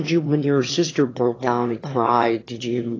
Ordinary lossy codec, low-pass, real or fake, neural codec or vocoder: AAC, 32 kbps; 7.2 kHz; fake; autoencoder, 22.05 kHz, a latent of 192 numbers a frame, VITS, trained on one speaker